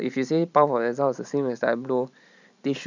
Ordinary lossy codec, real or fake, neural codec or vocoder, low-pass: none; fake; vocoder, 44.1 kHz, 128 mel bands every 512 samples, BigVGAN v2; 7.2 kHz